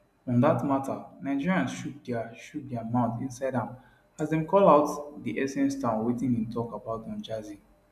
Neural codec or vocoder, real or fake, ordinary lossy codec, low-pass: none; real; none; 14.4 kHz